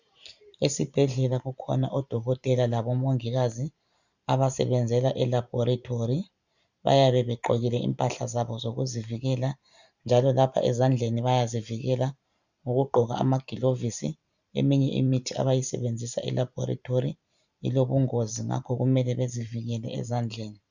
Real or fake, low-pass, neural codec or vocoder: fake; 7.2 kHz; vocoder, 44.1 kHz, 128 mel bands every 512 samples, BigVGAN v2